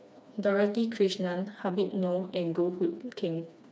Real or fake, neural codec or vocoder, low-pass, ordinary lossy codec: fake; codec, 16 kHz, 2 kbps, FreqCodec, smaller model; none; none